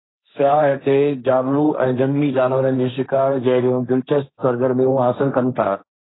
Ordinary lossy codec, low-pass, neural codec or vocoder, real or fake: AAC, 16 kbps; 7.2 kHz; codec, 16 kHz, 1.1 kbps, Voila-Tokenizer; fake